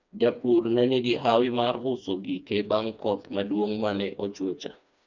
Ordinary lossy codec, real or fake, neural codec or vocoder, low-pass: none; fake; codec, 16 kHz, 2 kbps, FreqCodec, smaller model; 7.2 kHz